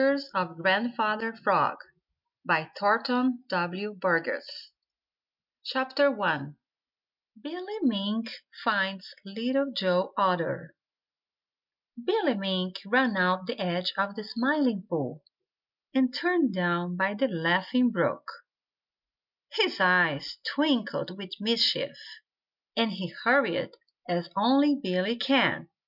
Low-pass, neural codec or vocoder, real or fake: 5.4 kHz; none; real